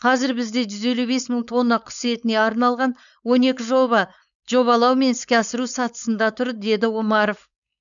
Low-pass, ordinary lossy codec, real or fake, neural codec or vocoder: 7.2 kHz; none; fake; codec, 16 kHz, 4.8 kbps, FACodec